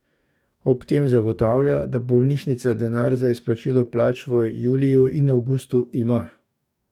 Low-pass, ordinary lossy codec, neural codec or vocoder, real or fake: 19.8 kHz; none; codec, 44.1 kHz, 2.6 kbps, DAC; fake